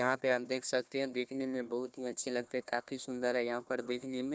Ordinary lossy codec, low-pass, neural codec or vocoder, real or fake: none; none; codec, 16 kHz, 1 kbps, FunCodec, trained on Chinese and English, 50 frames a second; fake